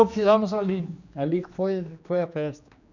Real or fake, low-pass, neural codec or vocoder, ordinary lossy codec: fake; 7.2 kHz; codec, 16 kHz, 2 kbps, X-Codec, HuBERT features, trained on general audio; none